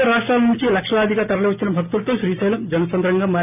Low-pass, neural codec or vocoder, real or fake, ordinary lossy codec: 3.6 kHz; none; real; none